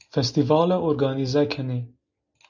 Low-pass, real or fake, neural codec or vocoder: 7.2 kHz; real; none